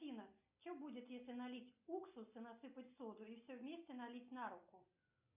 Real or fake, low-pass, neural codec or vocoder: real; 3.6 kHz; none